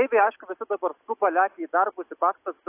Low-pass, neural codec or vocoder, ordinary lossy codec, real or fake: 3.6 kHz; none; AAC, 24 kbps; real